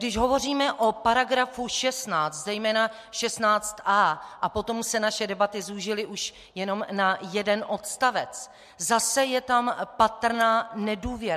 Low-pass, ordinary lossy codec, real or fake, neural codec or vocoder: 14.4 kHz; MP3, 64 kbps; real; none